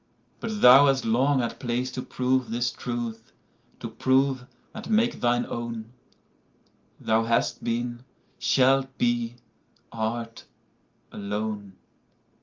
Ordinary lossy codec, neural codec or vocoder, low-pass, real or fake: Opus, 32 kbps; none; 7.2 kHz; real